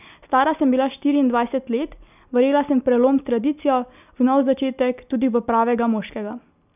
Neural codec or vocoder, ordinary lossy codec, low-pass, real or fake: none; none; 3.6 kHz; real